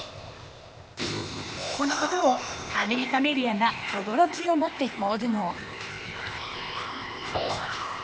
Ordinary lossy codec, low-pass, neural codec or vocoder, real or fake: none; none; codec, 16 kHz, 0.8 kbps, ZipCodec; fake